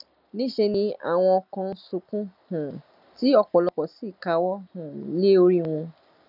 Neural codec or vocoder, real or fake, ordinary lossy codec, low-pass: none; real; none; 5.4 kHz